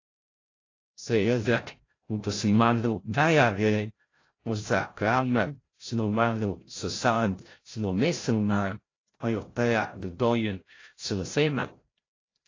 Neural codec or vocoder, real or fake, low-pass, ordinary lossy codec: codec, 16 kHz, 0.5 kbps, FreqCodec, larger model; fake; 7.2 kHz; AAC, 32 kbps